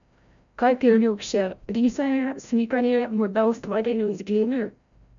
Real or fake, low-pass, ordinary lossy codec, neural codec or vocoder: fake; 7.2 kHz; none; codec, 16 kHz, 0.5 kbps, FreqCodec, larger model